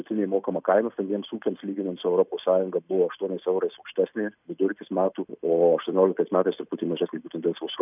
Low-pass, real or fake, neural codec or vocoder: 3.6 kHz; real; none